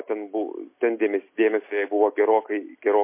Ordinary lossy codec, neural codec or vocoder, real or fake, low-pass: MP3, 24 kbps; none; real; 3.6 kHz